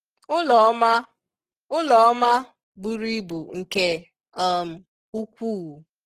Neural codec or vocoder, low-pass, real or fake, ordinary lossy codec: codec, 44.1 kHz, 7.8 kbps, Pupu-Codec; 14.4 kHz; fake; Opus, 16 kbps